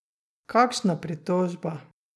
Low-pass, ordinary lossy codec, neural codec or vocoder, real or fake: none; none; none; real